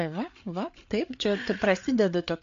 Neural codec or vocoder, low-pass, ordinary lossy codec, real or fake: codec, 16 kHz, 8 kbps, FreqCodec, larger model; 7.2 kHz; AAC, 64 kbps; fake